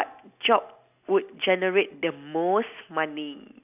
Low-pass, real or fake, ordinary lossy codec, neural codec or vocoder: 3.6 kHz; real; none; none